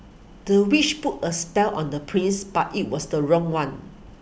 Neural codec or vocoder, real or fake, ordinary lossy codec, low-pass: none; real; none; none